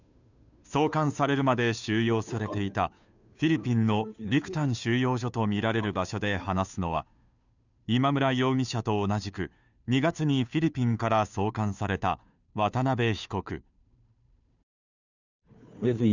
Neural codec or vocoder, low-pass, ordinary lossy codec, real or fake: codec, 16 kHz, 2 kbps, FunCodec, trained on Chinese and English, 25 frames a second; 7.2 kHz; none; fake